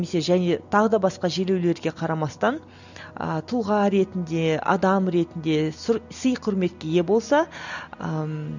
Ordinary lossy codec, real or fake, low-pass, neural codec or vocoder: none; real; 7.2 kHz; none